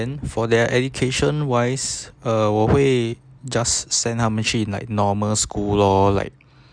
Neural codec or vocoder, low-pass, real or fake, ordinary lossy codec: none; 9.9 kHz; real; none